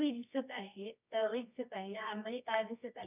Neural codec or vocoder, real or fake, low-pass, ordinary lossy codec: codec, 24 kHz, 0.9 kbps, WavTokenizer, medium music audio release; fake; 3.6 kHz; none